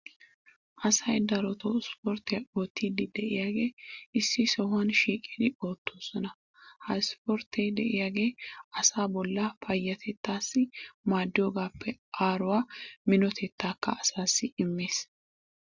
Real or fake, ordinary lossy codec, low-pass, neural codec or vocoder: real; Opus, 64 kbps; 7.2 kHz; none